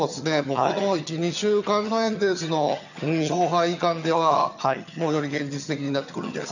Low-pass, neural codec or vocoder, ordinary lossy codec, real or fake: 7.2 kHz; vocoder, 22.05 kHz, 80 mel bands, HiFi-GAN; none; fake